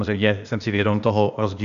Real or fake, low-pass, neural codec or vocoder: fake; 7.2 kHz; codec, 16 kHz, 0.8 kbps, ZipCodec